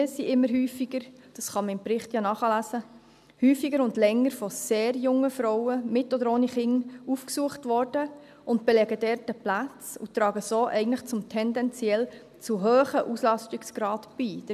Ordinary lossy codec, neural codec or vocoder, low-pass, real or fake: none; none; 14.4 kHz; real